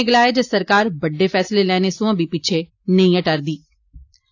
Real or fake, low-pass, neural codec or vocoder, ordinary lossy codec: real; 7.2 kHz; none; AAC, 48 kbps